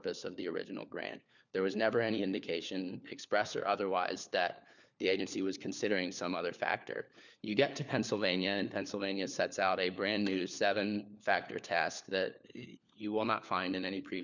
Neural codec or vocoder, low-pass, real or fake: codec, 16 kHz, 4 kbps, FunCodec, trained on LibriTTS, 50 frames a second; 7.2 kHz; fake